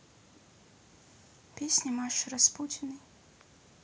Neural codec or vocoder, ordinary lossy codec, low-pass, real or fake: none; none; none; real